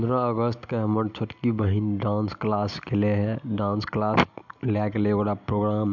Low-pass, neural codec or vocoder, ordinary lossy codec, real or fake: 7.2 kHz; none; MP3, 64 kbps; real